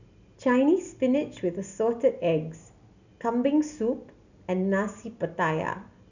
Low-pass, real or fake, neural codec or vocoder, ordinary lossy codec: 7.2 kHz; real; none; none